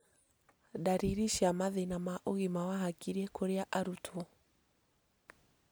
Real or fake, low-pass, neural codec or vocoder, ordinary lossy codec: real; none; none; none